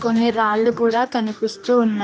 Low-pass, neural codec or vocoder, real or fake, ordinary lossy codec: none; codec, 16 kHz, 1 kbps, X-Codec, HuBERT features, trained on general audio; fake; none